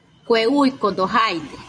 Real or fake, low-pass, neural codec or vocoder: fake; 9.9 kHz; vocoder, 24 kHz, 100 mel bands, Vocos